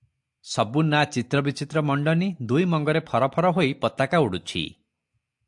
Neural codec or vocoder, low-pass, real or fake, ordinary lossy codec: none; 10.8 kHz; real; AAC, 64 kbps